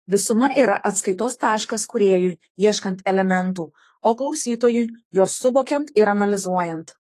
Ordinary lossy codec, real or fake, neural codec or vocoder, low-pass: AAC, 48 kbps; fake; codec, 32 kHz, 1.9 kbps, SNAC; 14.4 kHz